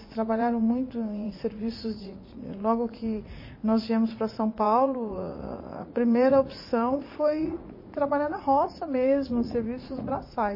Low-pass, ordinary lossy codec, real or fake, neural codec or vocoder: 5.4 kHz; MP3, 24 kbps; fake; vocoder, 44.1 kHz, 80 mel bands, Vocos